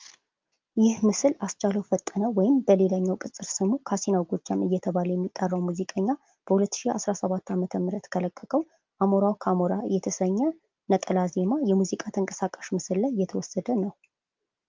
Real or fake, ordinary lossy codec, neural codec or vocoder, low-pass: real; Opus, 32 kbps; none; 7.2 kHz